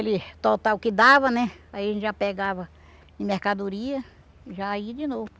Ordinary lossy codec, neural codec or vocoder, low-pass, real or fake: none; none; none; real